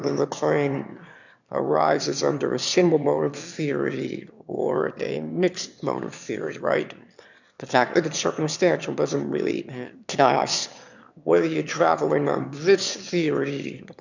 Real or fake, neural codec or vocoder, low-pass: fake; autoencoder, 22.05 kHz, a latent of 192 numbers a frame, VITS, trained on one speaker; 7.2 kHz